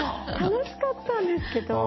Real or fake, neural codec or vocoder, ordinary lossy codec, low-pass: real; none; MP3, 24 kbps; 7.2 kHz